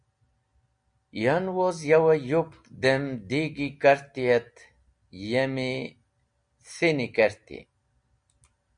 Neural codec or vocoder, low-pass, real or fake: none; 9.9 kHz; real